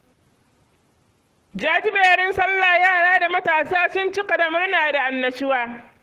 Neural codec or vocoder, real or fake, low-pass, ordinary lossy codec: codec, 44.1 kHz, 7.8 kbps, Pupu-Codec; fake; 14.4 kHz; Opus, 16 kbps